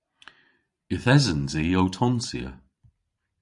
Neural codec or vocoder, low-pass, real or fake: vocoder, 44.1 kHz, 128 mel bands every 512 samples, BigVGAN v2; 10.8 kHz; fake